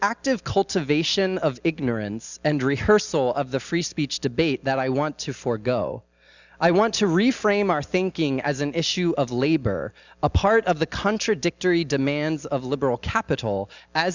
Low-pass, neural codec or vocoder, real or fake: 7.2 kHz; none; real